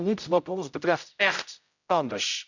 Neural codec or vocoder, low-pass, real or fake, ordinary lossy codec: codec, 16 kHz, 0.5 kbps, X-Codec, HuBERT features, trained on general audio; 7.2 kHz; fake; none